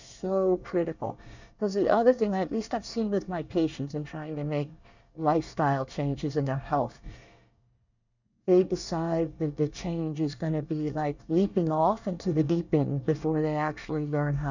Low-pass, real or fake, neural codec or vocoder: 7.2 kHz; fake; codec, 24 kHz, 1 kbps, SNAC